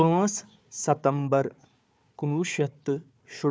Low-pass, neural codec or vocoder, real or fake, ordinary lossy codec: none; codec, 16 kHz, 4 kbps, FunCodec, trained on Chinese and English, 50 frames a second; fake; none